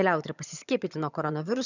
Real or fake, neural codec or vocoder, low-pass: fake; vocoder, 22.05 kHz, 80 mel bands, HiFi-GAN; 7.2 kHz